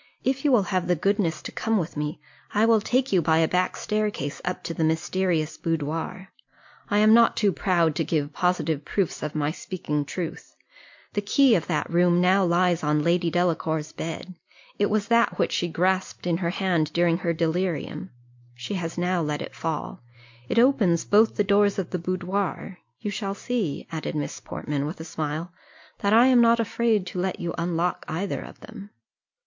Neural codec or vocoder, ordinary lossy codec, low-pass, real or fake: none; MP3, 48 kbps; 7.2 kHz; real